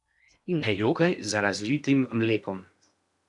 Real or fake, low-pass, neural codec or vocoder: fake; 10.8 kHz; codec, 16 kHz in and 24 kHz out, 0.8 kbps, FocalCodec, streaming, 65536 codes